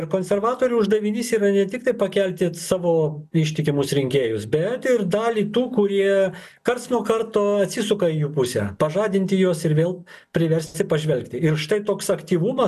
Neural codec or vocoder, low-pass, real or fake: none; 14.4 kHz; real